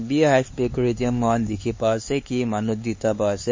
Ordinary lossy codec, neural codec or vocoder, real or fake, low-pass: MP3, 32 kbps; codec, 16 kHz, 4 kbps, X-Codec, HuBERT features, trained on LibriSpeech; fake; 7.2 kHz